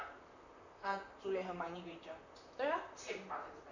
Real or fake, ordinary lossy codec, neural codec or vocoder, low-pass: fake; none; vocoder, 44.1 kHz, 128 mel bands, Pupu-Vocoder; 7.2 kHz